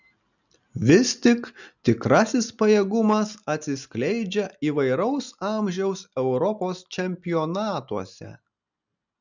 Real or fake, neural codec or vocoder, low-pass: real; none; 7.2 kHz